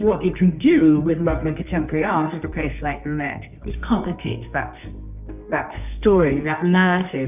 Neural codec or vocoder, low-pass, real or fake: codec, 24 kHz, 0.9 kbps, WavTokenizer, medium music audio release; 3.6 kHz; fake